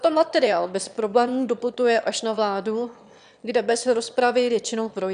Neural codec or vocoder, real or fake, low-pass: autoencoder, 22.05 kHz, a latent of 192 numbers a frame, VITS, trained on one speaker; fake; 9.9 kHz